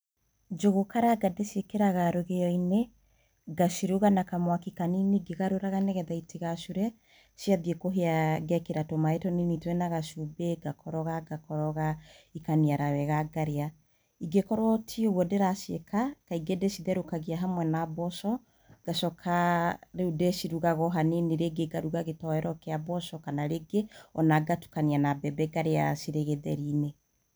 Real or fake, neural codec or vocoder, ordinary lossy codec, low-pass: real; none; none; none